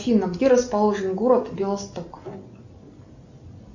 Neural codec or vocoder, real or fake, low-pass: none; real; 7.2 kHz